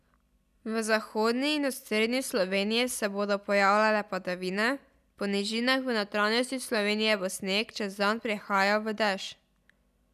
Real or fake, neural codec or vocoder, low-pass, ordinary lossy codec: real; none; 14.4 kHz; none